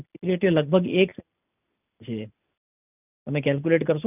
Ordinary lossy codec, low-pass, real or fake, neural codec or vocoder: none; 3.6 kHz; real; none